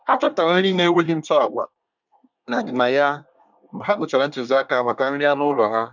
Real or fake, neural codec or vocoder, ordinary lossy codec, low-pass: fake; codec, 24 kHz, 1 kbps, SNAC; none; 7.2 kHz